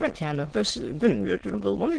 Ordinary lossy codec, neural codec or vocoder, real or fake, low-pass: Opus, 16 kbps; autoencoder, 22.05 kHz, a latent of 192 numbers a frame, VITS, trained on many speakers; fake; 9.9 kHz